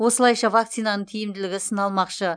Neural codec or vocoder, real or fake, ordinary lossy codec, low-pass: none; real; none; 9.9 kHz